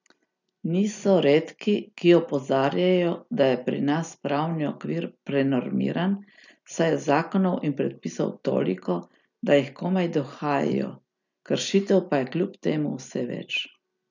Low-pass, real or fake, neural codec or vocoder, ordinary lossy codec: 7.2 kHz; real; none; none